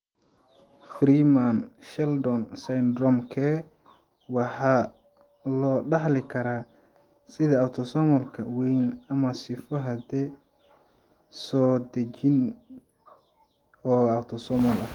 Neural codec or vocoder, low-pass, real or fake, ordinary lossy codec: autoencoder, 48 kHz, 128 numbers a frame, DAC-VAE, trained on Japanese speech; 19.8 kHz; fake; Opus, 24 kbps